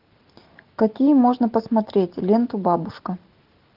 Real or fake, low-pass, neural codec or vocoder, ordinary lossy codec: real; 5.4 kHz; none; Opus, 32 kbps